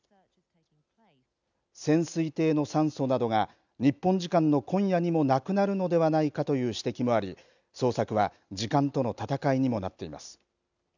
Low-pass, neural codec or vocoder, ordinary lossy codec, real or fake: 7.2 kHz; none; none; real